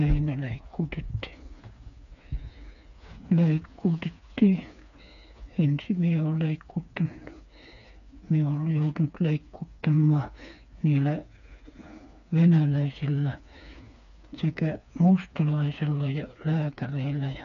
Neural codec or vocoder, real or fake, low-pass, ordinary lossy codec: codec, 16 kHz, 4 kbps, FreqCodec, smaller model; fake; 7.2 kHz; none